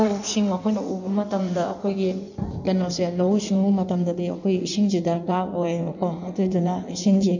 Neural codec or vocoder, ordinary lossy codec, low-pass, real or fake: codec, 16 kHz in and 24 kHz out, 1.1 kbps, FireRedTTS-2 codec; none; 7.2 kHz; fake